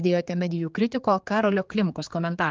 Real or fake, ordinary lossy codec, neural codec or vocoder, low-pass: fake; Opus, 24 kbps; codec, 16 kHz, 4 kbps, X-Codec, HuBERT features, trained on general audio; 7.2 kHz